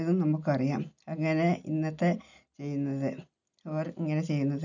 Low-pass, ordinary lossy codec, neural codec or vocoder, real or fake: 7.2 kHz; none; none; real